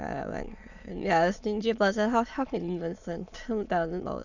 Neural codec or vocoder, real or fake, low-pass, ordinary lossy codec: autoencoder, 22.05 kHz, a latent of 192 numbers a frame, VITS, trained on many speakers; fake; 7.2 kHz; none